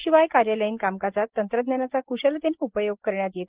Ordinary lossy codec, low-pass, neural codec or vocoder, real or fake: Opus, 16 kbps; 3.6 kHz; none; real